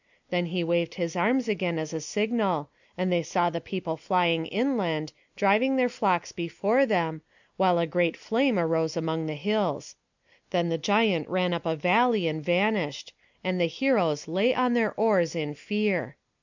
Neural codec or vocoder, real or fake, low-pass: none; real; 7.2 kHz